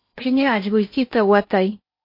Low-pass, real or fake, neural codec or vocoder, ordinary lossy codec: 5.4 kHz; fake; codec, 16 kHz in and 24 kHz out, 0.8 kbps, FocalCodec, streaming, 65536 codes; MP3, 32 kbps